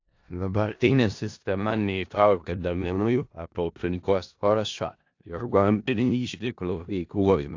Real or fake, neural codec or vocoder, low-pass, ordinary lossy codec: fake; codec, 16 kHz in and 24 kHz out, 0.4 kbps, LongCat-Audio-Codec, four codebook decoder; 7.2 kHz; AAC, 48 kbps